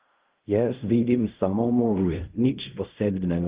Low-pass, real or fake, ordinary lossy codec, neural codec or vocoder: 3.6 kHz; fake; Opus, 32 kbps; codec, 16 kHz in and 24 kHz out, 0.4 kbps, LongCat-Audio-Codec, fine tuned four codebook decoder